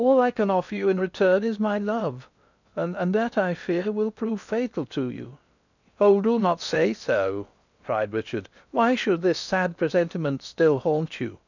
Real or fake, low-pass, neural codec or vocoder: fake; 7.2 kHz; codec, 16 kHz in and 24 kHz out, 0.6 kbps, FocalCodec, streaming, 2048 codes